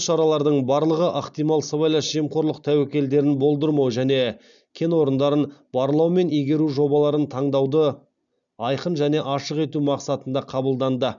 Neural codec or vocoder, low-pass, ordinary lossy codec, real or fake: none; 7.2 kHz; none; real